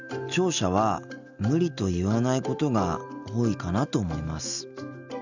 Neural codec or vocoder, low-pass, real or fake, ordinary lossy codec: none; 7.2 kHz; real; none